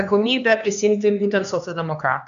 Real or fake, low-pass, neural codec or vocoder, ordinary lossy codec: fake; 7.2 kHz; codec, 16 kHz, 2 kbps, X-Codec, HuBERT features, trained on LibriSpeech; AAC, 96 kbps